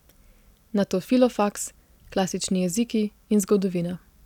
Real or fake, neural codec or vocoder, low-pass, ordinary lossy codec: real; none; 19.8 kHz; none